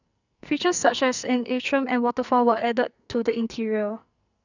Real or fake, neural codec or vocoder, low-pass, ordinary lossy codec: fake; codec, 44.1 kHz, 2.6 kbps, SNAC; 7.2 kHz; none